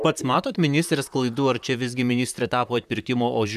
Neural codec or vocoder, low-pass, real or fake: vocoder, 44.1 kHz, 128 mel bands, Pupu-Vocoder; 14.4 kHz; fake